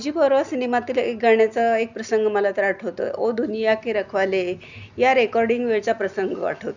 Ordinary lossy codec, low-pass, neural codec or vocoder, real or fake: none; 7.2 kHz; none; real